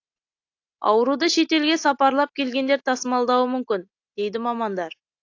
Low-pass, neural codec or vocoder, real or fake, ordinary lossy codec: 7.2 kHz; none; real; AAC, 48 kbps